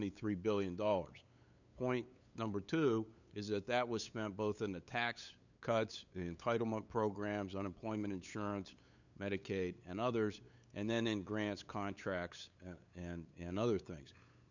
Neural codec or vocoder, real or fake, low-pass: codec, 16 kHz, 8 kbps, FunCodec, trained on LibriTTS, 25 frames a second; fake; 7.2 kHz